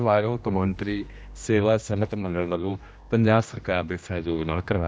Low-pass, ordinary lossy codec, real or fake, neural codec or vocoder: none; none; fake; codec, 16 kHz, 1 kbps, X-Codec, HuBERT features, trained on general audio